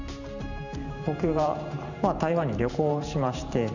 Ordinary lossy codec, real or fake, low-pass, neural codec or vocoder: none; fake; 7.2 kHz; vocoder, 44.1 kHz, 128 mel bands every 512 samples, BigVGAN v2